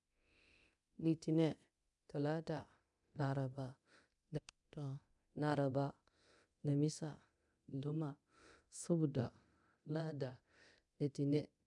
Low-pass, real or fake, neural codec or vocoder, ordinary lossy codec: 10.8 kHz; fake; codec, 24 kHz, 0.9 kbps, DualCodec; none